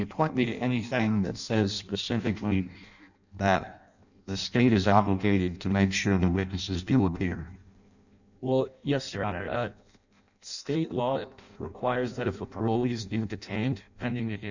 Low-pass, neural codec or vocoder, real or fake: 7.2 kHz; codec, 16 kHz in and 24 kHz out, 0.6 kbps, FireRedTTS-2 codec; fake